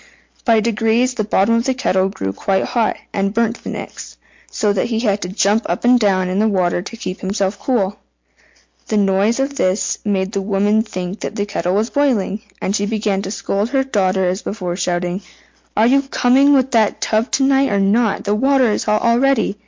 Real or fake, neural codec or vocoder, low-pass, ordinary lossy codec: real; none; 7.2 kHz; MP3, 48 kbps